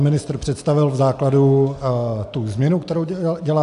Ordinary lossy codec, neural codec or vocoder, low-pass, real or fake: AAC, 64 kbps; none; 10.8 kHz; real